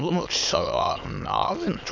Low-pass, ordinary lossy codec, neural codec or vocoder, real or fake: 7.2 kHz; none; autoencoder, 22.05 kHz, a latent of 192 numbers a frame, VITS, trained on many speakers; fake